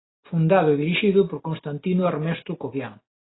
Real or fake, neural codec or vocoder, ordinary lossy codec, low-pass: real; none; AAC, 16 kbps; 7.2 kHz